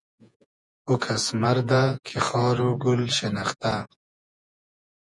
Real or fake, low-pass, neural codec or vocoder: fake; 10.8 kHz; vocoder, 48 kHz, 128 mel bands, Vocos